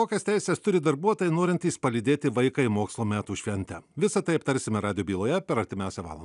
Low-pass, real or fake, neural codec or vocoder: 10.8 kHz; real; none